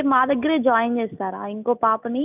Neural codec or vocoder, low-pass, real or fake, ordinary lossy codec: none; 3.6 kHz; real; none